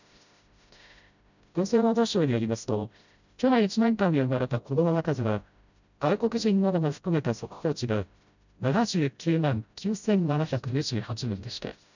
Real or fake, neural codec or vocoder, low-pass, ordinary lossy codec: fake; codec, 16 kHz, 0.5 kbps, FreqCodec, smaller model; 7.2 kHz; none